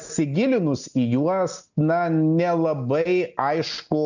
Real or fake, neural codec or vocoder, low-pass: real; none; 7.2 kHz